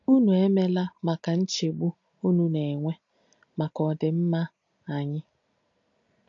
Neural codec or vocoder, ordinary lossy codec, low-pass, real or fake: none; AAC, 48 kbps; 7.2 kHz; real